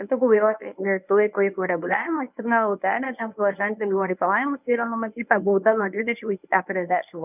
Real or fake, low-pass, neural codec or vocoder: fake; 3.6 kHz; codec, 24 kHz, 0.9 kbps, WavTokenizer, medium speech release version 1